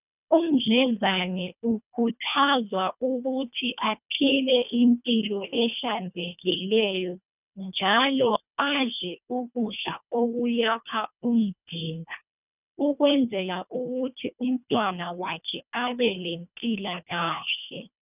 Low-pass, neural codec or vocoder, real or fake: 3.6 kHz; codec, 24 kHz, 1.5 kbps, HILCodec; fake